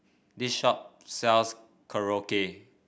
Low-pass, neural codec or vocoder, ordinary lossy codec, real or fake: none; none; none; real